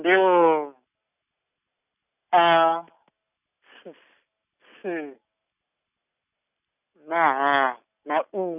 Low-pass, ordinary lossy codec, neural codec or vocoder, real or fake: 3.6 kHz; none; none; real